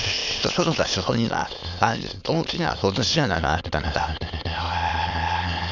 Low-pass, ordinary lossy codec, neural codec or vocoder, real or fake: 7.2 kHz; none; autoencoder, 22.05 kHz, a latent of 192 numbers a frame, VITS, trained on many speakers; fake